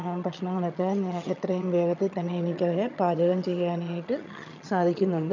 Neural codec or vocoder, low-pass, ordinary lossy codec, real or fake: vocoder, 22.05 kHz, 80 mel bands, HiFi-GAN; 7.2 kHz; none; fake